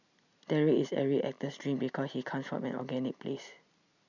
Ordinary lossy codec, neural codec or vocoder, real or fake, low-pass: none; none; real; 7.2 kHz